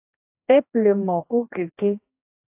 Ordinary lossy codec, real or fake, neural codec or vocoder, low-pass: AAC, 32 kbps; fake; codec, 16 kHz, 1 kbps, X-Codec, HuBERT features, trained on general audio; 3.6 kHz